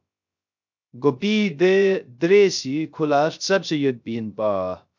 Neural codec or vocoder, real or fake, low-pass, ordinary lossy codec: codec, 16 kHz, 0.3 kbps, FocalCodec; fake; 7.2 kHz; MP3, 64 kbps